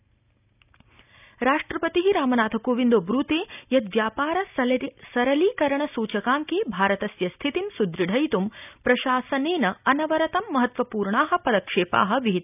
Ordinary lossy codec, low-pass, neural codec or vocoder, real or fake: none; 3.6 kHz; none; real